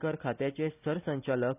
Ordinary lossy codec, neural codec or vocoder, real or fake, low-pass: none; none; real; 3.6 kHz